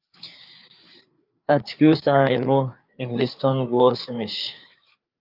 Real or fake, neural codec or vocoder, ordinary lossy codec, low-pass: fake; codec, 16 kHz, 4 kbps, FreqCodec, larger model; Opus, 32 kbps; 5.4 kHz